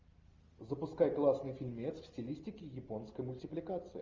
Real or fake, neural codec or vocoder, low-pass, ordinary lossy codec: real; none; 7.2 kHz; Opus, 32 kbps